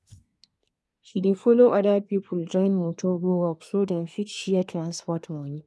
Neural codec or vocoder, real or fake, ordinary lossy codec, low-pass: codec, 24 kHz, 1 kbps, SNAC; fake; none; none